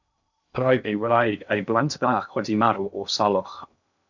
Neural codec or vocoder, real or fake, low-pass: codec, 16 kHz in and 24 kHz out, 0.6 kbps, FocalCodec, streaming, 2048 codes; fake; 7.2 kHz